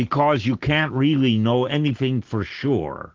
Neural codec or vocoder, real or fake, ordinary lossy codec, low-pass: none; real; Opus, 16 kbps; 7.2 kHz